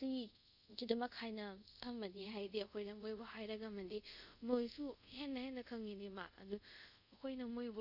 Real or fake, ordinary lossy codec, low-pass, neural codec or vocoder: fake; none; 5.4 kHz; codec, 24 kHz, 0.5 kbps, DualCodec